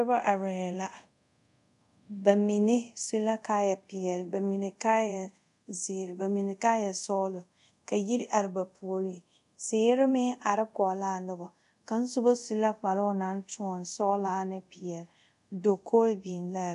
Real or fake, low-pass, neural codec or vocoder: fake; 10.8 kHz; codec, 24 kHz, 0.5 kbps, DualCodec